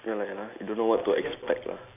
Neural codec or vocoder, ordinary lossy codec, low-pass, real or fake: none; none; 3.6 kHz; real